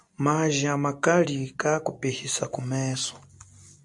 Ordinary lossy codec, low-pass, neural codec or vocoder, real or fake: MP3, 96 kbps; 10.8 kHz; none; real